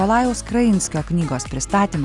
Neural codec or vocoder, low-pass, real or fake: none; 10.8 kHz; real